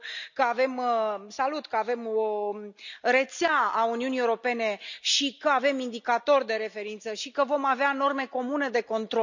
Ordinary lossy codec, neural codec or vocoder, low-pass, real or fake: none; none; 7.2 kHz; real